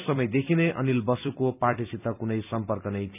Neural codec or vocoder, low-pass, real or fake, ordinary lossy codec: vocoder, 44.1 kHz, 128 mel bands every 256 samples, BigVGAN v2; 3.6 kHz; fake; none